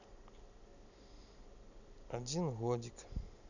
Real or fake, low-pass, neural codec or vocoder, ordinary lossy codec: real; 7.2 kHz; none; none